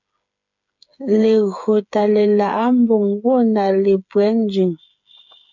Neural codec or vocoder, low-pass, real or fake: codec, 16 kHz, 8 kbps, FreqCodec, smaller model; 7.2 kHz; fake